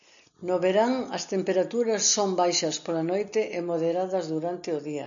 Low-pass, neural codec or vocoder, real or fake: 7.2 kHz; none; real